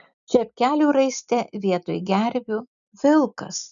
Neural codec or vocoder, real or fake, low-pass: none; real; 7.2 kHz